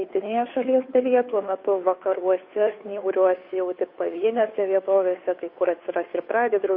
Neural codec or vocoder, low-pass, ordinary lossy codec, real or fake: codec, 16 kHz, 2 kbps, FunCodec, trained on Chinese and English, 25 frames a second; 5.4 kHz; MP3, 32 kbps; fake